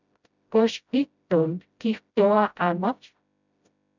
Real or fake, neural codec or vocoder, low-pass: fake; codec, 16 kHz, 0.5 kbps, FreqCodec, smaller model; 7.2 kHz